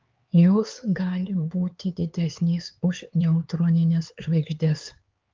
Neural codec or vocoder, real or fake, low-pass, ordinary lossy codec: codec, 16 kHz, 4 kbps, X-Codec, HuBERT features, trained on LibriSpeech; fake; 7.2 kHz; Opus, 24 kbps